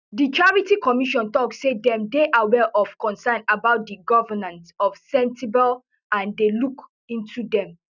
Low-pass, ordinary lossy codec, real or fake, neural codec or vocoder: 7.2 kHz; none; real; none